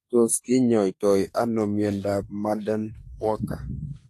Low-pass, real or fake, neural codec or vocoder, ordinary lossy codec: 14.4 kHz; fake; autoencoder, 48 kHz, 32 numbers a frame, DAC-VAE, trained on Japanese speech; AAC, 48 kbps